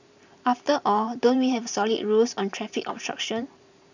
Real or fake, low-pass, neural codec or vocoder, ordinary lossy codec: fake; 7.2 kHz; vocoder, 44.1 kHz, 128 mel bands every 512 samples, BigVGAN v2; none